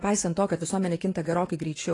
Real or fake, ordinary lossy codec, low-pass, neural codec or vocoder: fake; AAC, 32 kbps; 10.8 kHz; vocoder, 24 kHz, 100 mel bands, Vocos